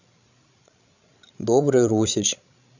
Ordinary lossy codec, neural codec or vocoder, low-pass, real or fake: none; codec, 16 kHz, 16 kbps, FreqCodec, larger model; 7.2 kHz; fake